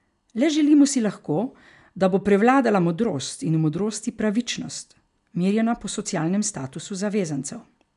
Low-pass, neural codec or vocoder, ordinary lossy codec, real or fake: 10.8 kHz; none; none; real